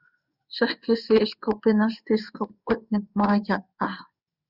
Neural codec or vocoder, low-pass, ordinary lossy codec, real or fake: codec, 16 kHz in and 24 kHz out, 1 kbps, XY-Tokenizer; 5.4 kHz; Opus, 64 kbps; fake